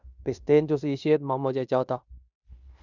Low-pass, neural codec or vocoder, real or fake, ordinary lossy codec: 7.2 kHz; codec, 16 kHz in and 24 kHz out, 0.9 kbps, LongCat-Audio-Codec, fine tuned four codebook decoder; fake; none